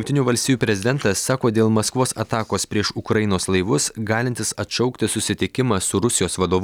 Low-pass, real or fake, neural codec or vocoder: 19.8 kHz; real; none